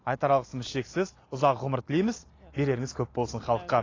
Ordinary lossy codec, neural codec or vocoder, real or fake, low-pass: AAC, 32 kbps; none; real; 7.2 kHz